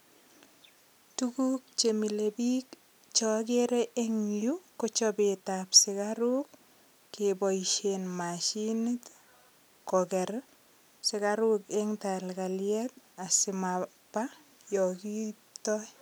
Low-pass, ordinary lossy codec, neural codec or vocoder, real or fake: none; none; none; real